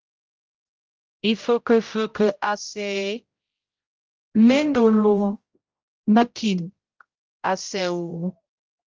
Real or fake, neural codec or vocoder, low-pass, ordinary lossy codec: fake; codec, 16 kHz, 0.5 kbps, X-Codec, HuBERT features, trained on general audio; 7.2 kHz; Opus, 24 kbps